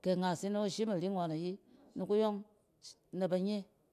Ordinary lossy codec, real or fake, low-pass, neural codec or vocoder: AAC, 96 kbps; real; 14.4 kHz; none